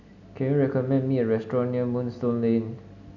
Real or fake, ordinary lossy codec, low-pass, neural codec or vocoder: real; none; 7.2 kHz; none